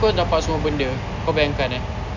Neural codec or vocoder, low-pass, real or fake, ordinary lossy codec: none; 7.2 kHz; real; none